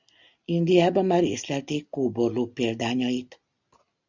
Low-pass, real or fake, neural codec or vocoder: 7.2 kHz; real; none